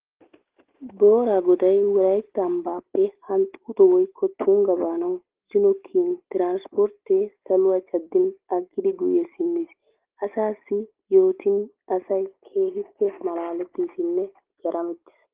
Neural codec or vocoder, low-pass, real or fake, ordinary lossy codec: none; 3.6 kHz; real; Opus, 16 kbps